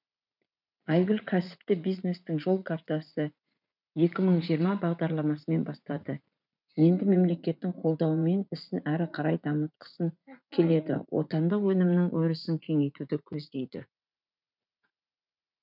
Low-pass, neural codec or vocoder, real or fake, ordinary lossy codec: 5.4 kHz; vocoder, 44.1 kHz, 80 mel bands, Vocos; fake; none